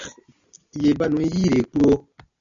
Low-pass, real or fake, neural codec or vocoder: 7.2 kHz; real; none